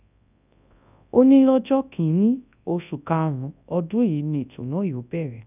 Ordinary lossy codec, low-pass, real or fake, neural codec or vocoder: none; 3.6 kHz; fake; codec, 24 kHz, 0.9 kbps, WavTokenizer, large speech release